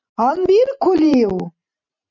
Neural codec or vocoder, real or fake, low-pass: vocoder, 44.1 kHz, 128 mel bands every 512 samples, BigVGAN v2; fake; 7.2 kHz